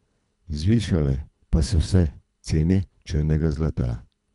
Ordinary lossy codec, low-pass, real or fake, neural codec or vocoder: none; 10.8 kHz; fake; codec, 24 kHz, 3 kbps, HILCodec